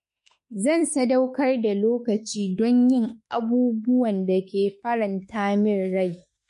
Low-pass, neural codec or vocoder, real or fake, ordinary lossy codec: 14.4 kHz; autoencoder, 48 kHz, 32 numbers a frame, DAC-VAE, trained on Japanese speech; fake; MP3, 48 kbps